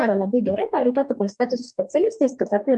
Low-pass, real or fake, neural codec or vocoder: 10.8 kHz; fake; codec, 44.1 kHz, 2.6 kbps, DAC